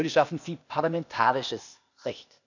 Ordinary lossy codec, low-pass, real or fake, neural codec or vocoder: none; 7.2 kHz; fake; codec, 16 kHz, 0.8 kbps, ZipCodec